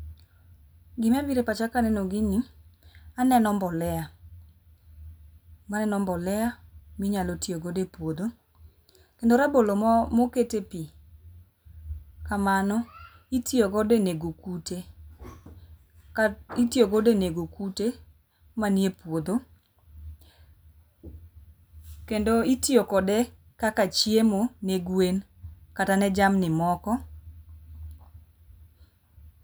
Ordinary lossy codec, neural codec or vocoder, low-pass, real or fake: none; none; none; real